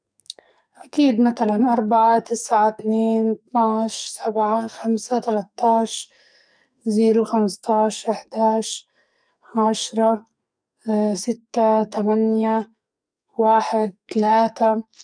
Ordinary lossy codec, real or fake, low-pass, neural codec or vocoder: none; fake; 9.9 kHz; codec, 44.1 kHz, 2.6 kbps, SNAC